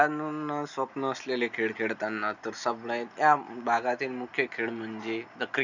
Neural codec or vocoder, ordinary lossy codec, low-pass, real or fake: none; none; 7.2 kHz; real